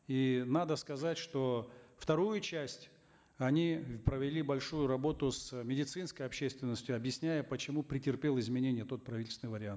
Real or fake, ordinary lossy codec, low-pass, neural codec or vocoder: real; none; none; none